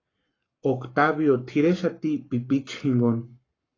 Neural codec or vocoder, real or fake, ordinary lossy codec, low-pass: codec, 44.1 kHz, 7.8 kbps, Pupu-Codec; fake; AAC, 32 kbps; 7.2 kHz